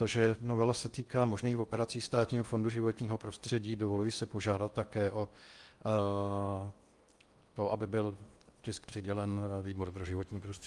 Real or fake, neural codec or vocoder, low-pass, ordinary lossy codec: fake; codec, 16 kHz in and 24 kHz out, 0.8 kbps, FocalCodec, streaming, 65536 codes; 10.8 kHz; Opus, 32 kbps